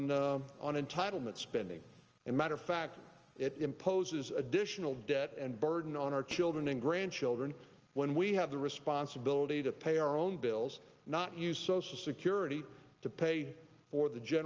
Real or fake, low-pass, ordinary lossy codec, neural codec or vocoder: real; 7.2 kHz; Opus, 24 kbps; none